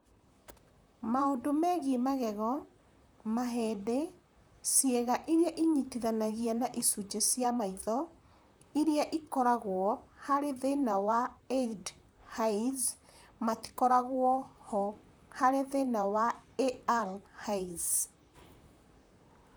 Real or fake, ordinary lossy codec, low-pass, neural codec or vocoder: fake; none; none; vocoder, 44.1 kHz, 128 mel bands, Pupu-Vocoder